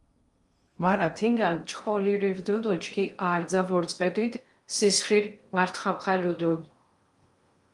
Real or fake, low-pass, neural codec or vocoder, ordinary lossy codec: fake; 10.8 kHz; codec, 16 kHz in and 24 kHz out, 0.6 kbps, FocalCodec, streaming, 2048 codes; Opus, 32 kbps